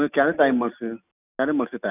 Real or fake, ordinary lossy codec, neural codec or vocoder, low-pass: real; none; none; 3.6 kHz